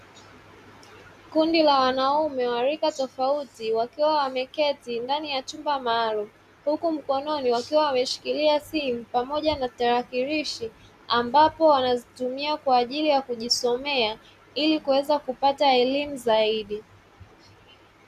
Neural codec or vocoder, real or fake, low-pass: none; real; 14.4 kHz